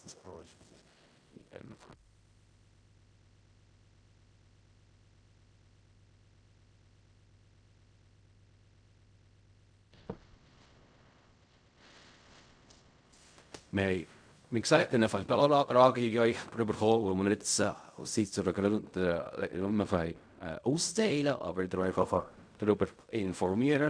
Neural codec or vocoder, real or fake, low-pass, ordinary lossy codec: codec, 16 kHz in and 24 kHz out, 0.4 kbps, LongCat-Audio-Codec, fine tuned four codebook decoder; fake; 9.9 kHz; none